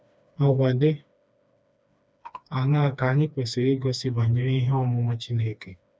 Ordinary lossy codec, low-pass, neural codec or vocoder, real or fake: none; none; codec, 16 kHz, 2 kbps, FreqCodec, smaller model; fake